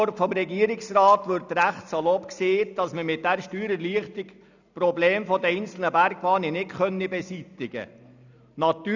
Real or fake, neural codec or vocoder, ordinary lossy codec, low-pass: real; none; none; 7.2 kHz